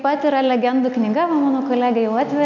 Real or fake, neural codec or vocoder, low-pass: real; none; 7.2 kHz